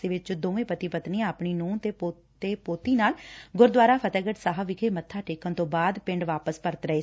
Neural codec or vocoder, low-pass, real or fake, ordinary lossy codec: none; none; real; none